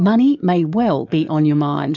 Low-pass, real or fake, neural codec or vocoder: 7.2 kHz; fake; codec, 44.1 kHz, 7.8 kbps, DAC